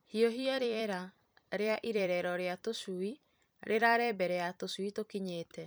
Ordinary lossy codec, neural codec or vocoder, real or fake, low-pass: none; vocoder, 44.1 kHz, 128 mel bands every 256 samples, BigVGAN v2; fake; none